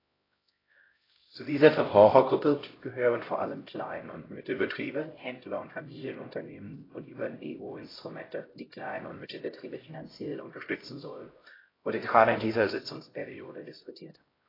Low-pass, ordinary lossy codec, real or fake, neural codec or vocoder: 5.4 kHz; AAC, 24 kbps; fake; codec, 16 kHz, 0.5 kbps, X-Codec, HuBERT features, trained on LibriSpeech